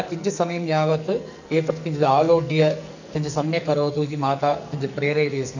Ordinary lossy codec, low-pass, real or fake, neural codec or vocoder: none; 7.2 kHz; fake; codec, 44.1 kHz, 2.6 kbps, SNAC